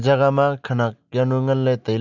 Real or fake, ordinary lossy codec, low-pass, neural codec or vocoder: real; none; 7.2 kHz; none